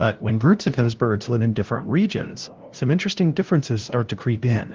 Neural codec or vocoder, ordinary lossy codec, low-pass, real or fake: codec, 16 kHz, 0.5 kbps, FunCodec, trained on LibriTTS, 25 frames a second; Opus, 16 kbps; 7.2 kHz; fake